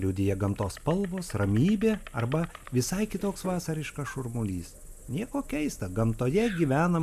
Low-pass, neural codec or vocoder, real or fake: 14.4 kHz; none; real